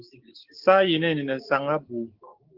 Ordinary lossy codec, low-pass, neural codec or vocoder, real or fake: Opus, 16 kbps; 5.4 kHz; codec, 16 kHz, 6 kbps, DAC; fake